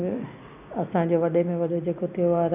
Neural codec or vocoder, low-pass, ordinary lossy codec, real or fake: none; 3.6 kHz; none; real